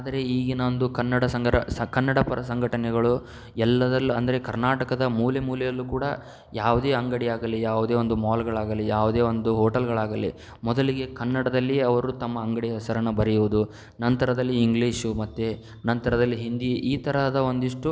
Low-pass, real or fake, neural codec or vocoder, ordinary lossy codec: none; real; none; none